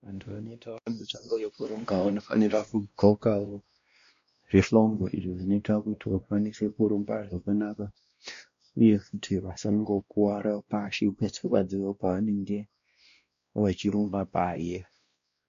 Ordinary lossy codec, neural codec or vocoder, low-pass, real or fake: MP3, 48 kbps; codec, 16 kHz, 1 kbps, X-Codec, WavLM features, trained on Multilingual LibriSpeech; 7.2 kHz; fake